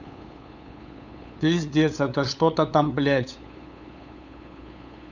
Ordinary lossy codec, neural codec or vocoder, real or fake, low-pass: none; codec, 16 kHz, 8 kbps, FunCodec, trained on LibriTTS, 25 frames a second; fake; 7.2 kHz